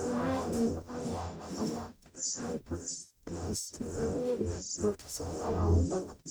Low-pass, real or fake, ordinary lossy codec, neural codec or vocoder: none; fake; none; codec, 44.1 kHz, 0.9 kbps, DAC